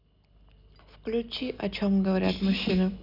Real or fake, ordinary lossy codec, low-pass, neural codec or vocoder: real; none; 5.4 kHz; none